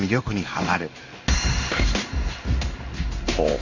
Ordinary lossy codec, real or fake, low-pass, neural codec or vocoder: none; fake; 7.2 kHz; codec, 16 kHz in and 24 kHz out, 1 kbps, XY-Tokenizer